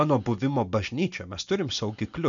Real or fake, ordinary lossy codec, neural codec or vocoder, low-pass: real; MP3, 96 kbps; none; 7.2 kHz